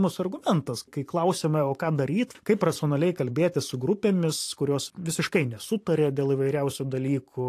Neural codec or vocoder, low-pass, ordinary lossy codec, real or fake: vocoder, 44.1 kHz, 128 mel bands every 512 samples, BigVGAN v2; 14.4 kHz; AAC, 64 kbps; fake